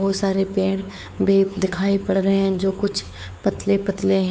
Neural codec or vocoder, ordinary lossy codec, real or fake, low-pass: codec, 16 kHz, 4 kbps, X-Codec, WavLM features, trained on Multilingual LibriSpeech; none; fake; none